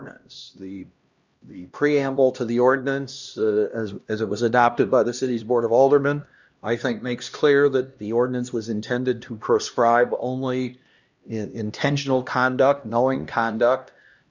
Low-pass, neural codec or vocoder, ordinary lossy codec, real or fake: 7.2 kHz; codec, 16 kHz, 1 kbps, X-Codec, HuBERT features, trained on LibriSpeech; Opus, 64 kbps; fake